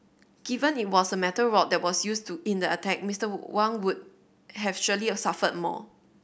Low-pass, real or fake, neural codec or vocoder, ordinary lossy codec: none; real; none; none